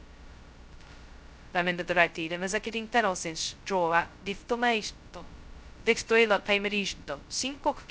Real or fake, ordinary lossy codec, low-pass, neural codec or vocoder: fake; none; none; codec, 16 kHz, 0.2 kbps, FocalCodec